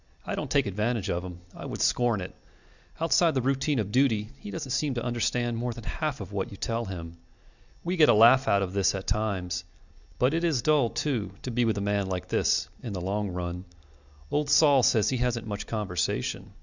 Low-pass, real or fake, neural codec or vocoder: 7.2 kHz; real; none